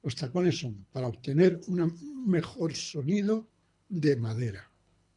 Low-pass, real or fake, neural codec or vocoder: 10.8 kHz; fake; codec, 24 kHz, 3 kbps, HILCodec